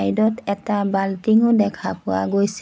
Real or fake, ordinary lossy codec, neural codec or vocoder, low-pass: real; none; none; none